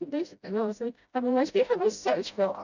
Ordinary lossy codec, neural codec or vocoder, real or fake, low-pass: none; codec, 16 kHz, 0.5 kbps, FreqCodec, smaller model; fake; 7.2 kHz